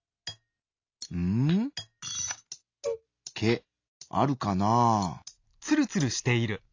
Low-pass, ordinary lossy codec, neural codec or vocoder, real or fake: 7.2 kHz; AAC, 48 kbps; none; real